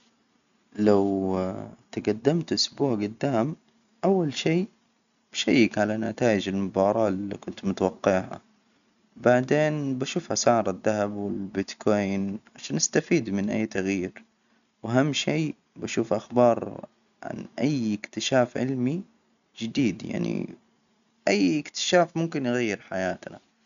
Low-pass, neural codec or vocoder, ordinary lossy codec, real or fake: 7.2 kHz; none; none; real